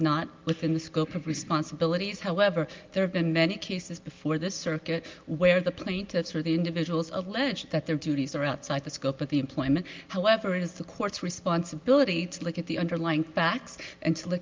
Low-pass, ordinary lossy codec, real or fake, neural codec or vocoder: 7.2 kHz; Opus, 32 kbps; real; none